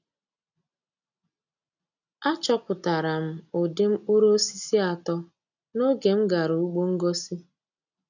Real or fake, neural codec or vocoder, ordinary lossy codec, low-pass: real; none; none; 7.2 kHz